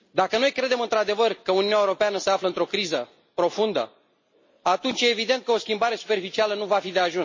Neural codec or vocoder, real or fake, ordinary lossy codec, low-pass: none; real; MP3, 32 kbps; 7.2 kHz